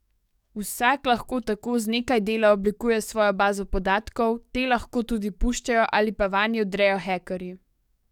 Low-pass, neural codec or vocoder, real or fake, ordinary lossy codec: 19.8 kHz; codec, 44.1 kHz, 7.8 kbps, DAC; fake; none